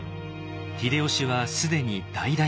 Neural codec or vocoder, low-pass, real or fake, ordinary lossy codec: none; none; real; none